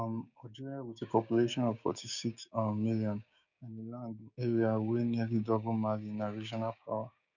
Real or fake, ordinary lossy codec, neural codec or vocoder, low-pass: fake; none; codec, 16 kHz, 6 kbps, DAC; 7.2 kHz